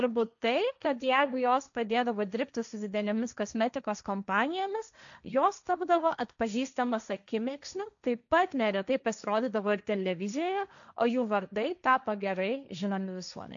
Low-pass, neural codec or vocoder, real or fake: 7.2 kHz; codec, 16 kHz, 1.1 kbps, Voila-Tokenizer; fake